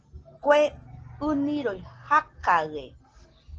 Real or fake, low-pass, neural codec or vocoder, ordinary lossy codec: real; 7.2 kHz; none; Opus, 16 kbps